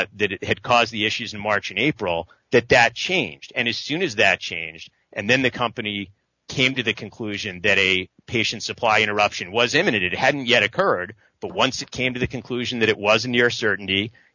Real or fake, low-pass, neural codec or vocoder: real; 7.2 kHz; none